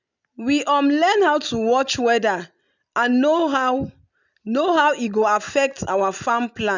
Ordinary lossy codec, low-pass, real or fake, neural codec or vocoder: none; 7.2 kHz; real; none